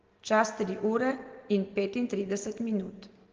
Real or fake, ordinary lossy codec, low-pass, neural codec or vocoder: real; Opus, 16 kbps; 7.2 kHz; none